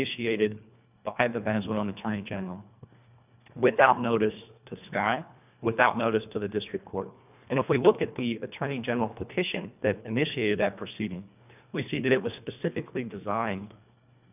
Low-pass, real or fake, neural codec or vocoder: 3.6 kHz; fake; codec, 24 kHz, 1.5 kbps, HILCodec